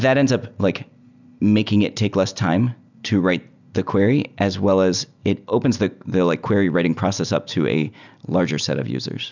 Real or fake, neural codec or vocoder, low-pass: real; none; 7.2 kHz